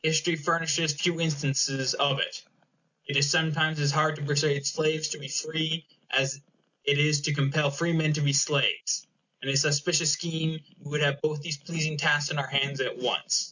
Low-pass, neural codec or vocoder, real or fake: 7.2 kHz; none; real